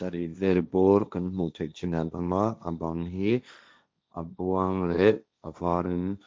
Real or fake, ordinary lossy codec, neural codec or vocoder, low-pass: fake; none; codec, 16 kHz, 1.1 kbps, Voila-Tokenizer; none